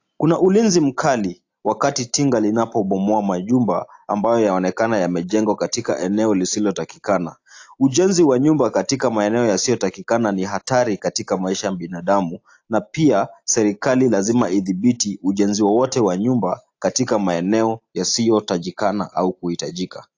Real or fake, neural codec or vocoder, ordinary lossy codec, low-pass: real; none; AAC, 48 kbps; 7.2 kHz